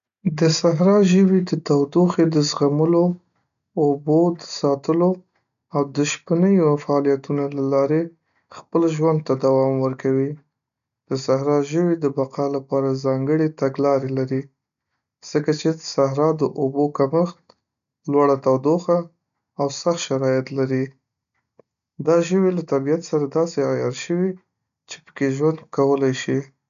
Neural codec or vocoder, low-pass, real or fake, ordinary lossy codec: none; 7.2 kHz; real; none